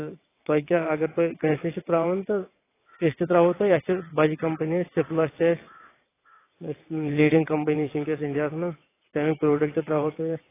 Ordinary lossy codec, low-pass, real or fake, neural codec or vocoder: AAC, 16 kbps; 3.6 kHz; fake; vocoder, 22.05 kHz, 80 mel bands, WaveNeXt